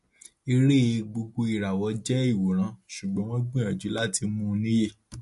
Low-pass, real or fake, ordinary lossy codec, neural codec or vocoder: 14.4 kHz; real; MP3, 48 kbps; none